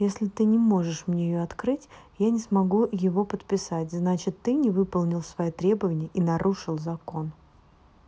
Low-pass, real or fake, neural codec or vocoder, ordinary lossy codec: none; real; none; none